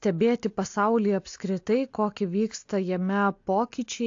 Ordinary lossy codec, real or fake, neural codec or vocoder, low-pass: AAC, 48 kbps; real; none; 7.2 kHz